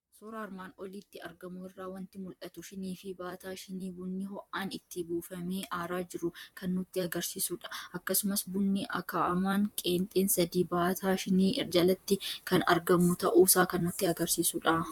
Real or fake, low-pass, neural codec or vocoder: fake; 19.8 kHz; vocoder, 44.1 kHz, 128 mel bands, Pupu-Vocoder